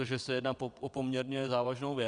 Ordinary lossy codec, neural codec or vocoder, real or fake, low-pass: Opus, 64 kbps; vocoder, 22.05 kHz, 80 mel bands, WaveNeXt; fake; 9.9 kHz